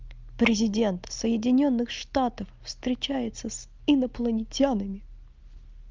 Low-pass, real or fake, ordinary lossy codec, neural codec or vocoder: 7.2 kHz; real; Opus, 24 kbps; none